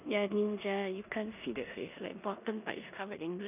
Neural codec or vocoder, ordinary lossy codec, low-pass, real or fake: codec, 16 kHz in and 24 kHz out, 0.9 kbps, LongCat-Audio-Codec, four codebook decoder; none; 3.6 kHz; fake